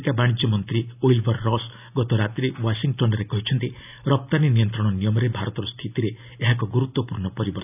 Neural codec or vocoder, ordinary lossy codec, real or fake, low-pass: none; none; real; 3.6 kHz